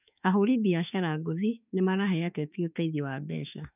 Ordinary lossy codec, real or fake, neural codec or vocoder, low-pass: none; fake; autoencoder, 48 kHz, 32 numbers a frame, DAC-VAE, trained on Japanese speech; 3.6 kHz